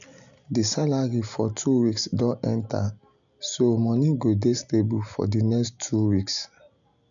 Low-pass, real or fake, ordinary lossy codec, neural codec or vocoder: 7.2 kHz; real; none; none